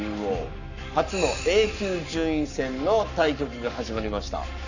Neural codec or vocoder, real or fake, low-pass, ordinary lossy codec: codec, 44.1 kHz, 7.8 kbps, Pupu-Codec; fake; 7.2 kHz; none